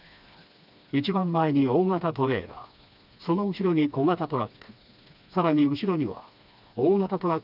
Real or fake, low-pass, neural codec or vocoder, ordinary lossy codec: fake; 5.4 kHz; codec, 16 kHz, 2 kbps, FreqCodec, smaller model; none